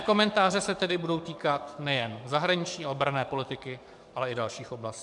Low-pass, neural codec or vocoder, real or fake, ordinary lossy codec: 10.8 kHz; codec, 44.1 kHz, 7.8 kbps, DAC; fake; MP3, 96 kbps